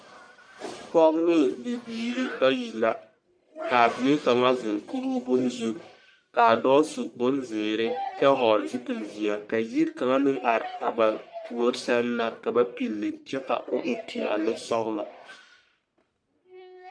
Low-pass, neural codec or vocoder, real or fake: 9.9 kHz; codec, 44.1 kHz, 1.7 kbps, Pupu-Codec; fake